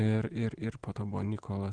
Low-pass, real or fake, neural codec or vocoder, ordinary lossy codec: 9.9 kHz; real; none; Opus, 16 kbps